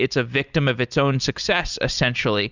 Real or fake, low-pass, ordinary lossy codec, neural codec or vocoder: real; 7.2 kHz; Opus, 64 kbps; none